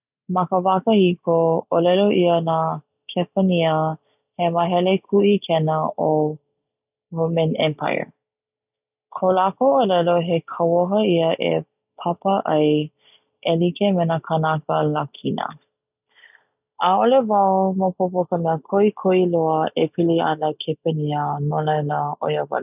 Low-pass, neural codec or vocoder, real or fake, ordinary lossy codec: 3.6 kHz; none; real; none